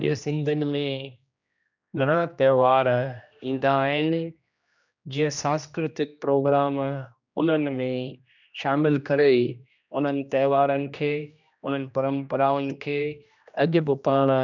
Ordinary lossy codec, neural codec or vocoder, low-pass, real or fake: none; codec, 16 kHz, 1 kbps, X-Codec, HuBERT features, trained on general audio; 7.2 kHz; fake